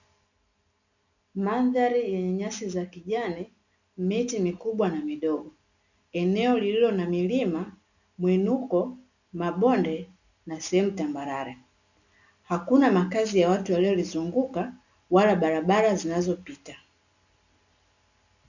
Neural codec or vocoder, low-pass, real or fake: none; 7.2 kHz; real